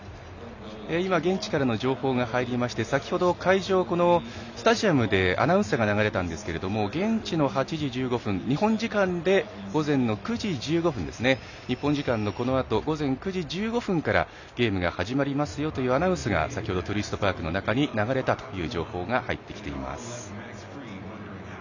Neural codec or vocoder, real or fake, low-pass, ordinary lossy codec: none; real; 7.2 kHz; none